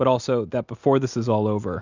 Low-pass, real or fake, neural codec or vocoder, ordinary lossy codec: 7.2 kHz; real; none; Opus, 64 kbps